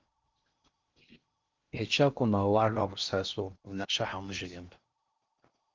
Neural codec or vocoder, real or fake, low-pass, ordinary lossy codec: codec, 16 kHz in and 24 kHz out, 0.6 kbps, FocalCodec, streaming, 4096 codes; fake; 7.2 kHz; Opus, 24 kbps